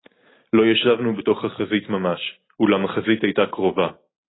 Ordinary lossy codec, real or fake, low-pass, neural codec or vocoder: AAC, 16 kbps; real; 7.2 kHz; none